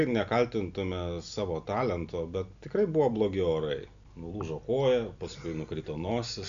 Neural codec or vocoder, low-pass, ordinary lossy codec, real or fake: none; 7.2 kHz; AAC, 96 kbps; real